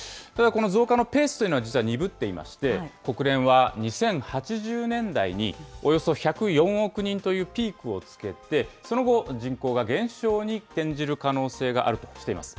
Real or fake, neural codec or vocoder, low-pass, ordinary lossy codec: real; none; none; none